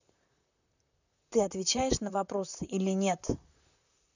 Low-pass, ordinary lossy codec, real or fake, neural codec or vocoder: 7.2 kHz; none; fake; vocoder, 44.1 kHz, 128 mel bands, Pupu-Vocoder